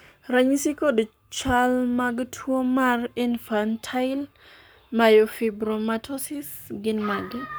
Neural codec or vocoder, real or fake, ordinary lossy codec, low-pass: codec, 44.1 kHz, 7.8 kbps, Pupu-Codec; fake; none; none